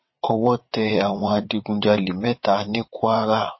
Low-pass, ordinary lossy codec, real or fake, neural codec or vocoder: 7.2 kHz; MP3, 24 kbps; fake; vocoder, 22.05 kHz, 80 mel bands, WaveNeXt